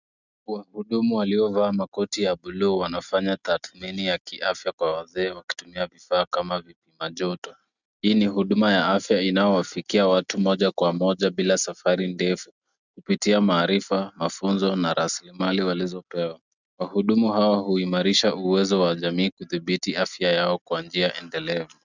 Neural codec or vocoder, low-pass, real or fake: none; 7.2 kHz; real